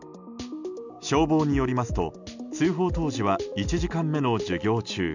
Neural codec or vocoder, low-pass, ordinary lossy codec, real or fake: none; 7.2 kHz; none; real